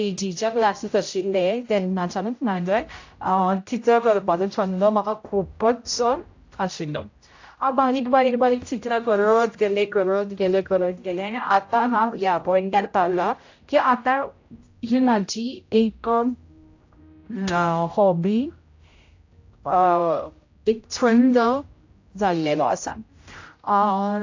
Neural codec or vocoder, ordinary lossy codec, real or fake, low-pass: codec, 16 kHz, 0.5 kbps, X-Codec, HuBERT features, trained on general audio; AAC, 48 kbps; fake; 7.2 kHz